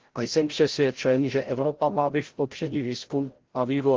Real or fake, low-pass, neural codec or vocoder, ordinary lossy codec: fake; 7.2 kHz; codec, 16 kHz, 0.5 kbps, FreqCodec, larger model; Opus, 16 kbps